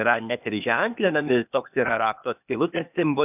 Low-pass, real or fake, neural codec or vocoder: 3.6 kHz; fake; codec, 16 kHz, 0.8 kbps, ZipCodec